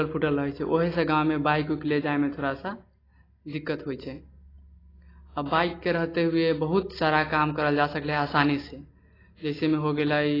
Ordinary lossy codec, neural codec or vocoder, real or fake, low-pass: AAC, 24 kbps; none; real; 5.4 kHz